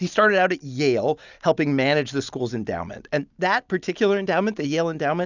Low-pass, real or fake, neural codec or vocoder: 7.2 kHz; real; none